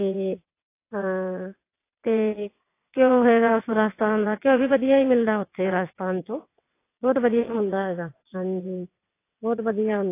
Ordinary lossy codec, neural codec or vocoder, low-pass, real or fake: AAC, 24 kbps; vocoder, 22.05 kHz, 80 mel bands, WaveNeXt; 3.6 kHz; fake